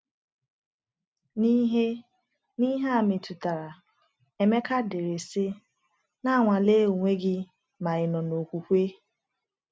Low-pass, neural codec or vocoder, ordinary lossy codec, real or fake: none; none; none; real